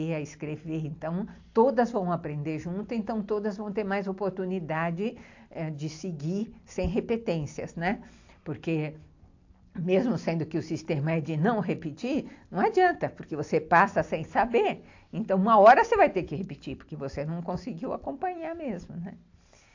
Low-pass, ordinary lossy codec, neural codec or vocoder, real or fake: 7.2 kHz; AAC, 48 kbps; none; real